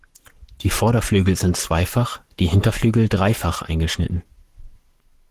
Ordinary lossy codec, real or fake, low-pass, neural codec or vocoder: Opus, 16 kbps; fake; 14.4 kHz; codec, 44.1 kHz, 7.8 kbps, Pupu-Codec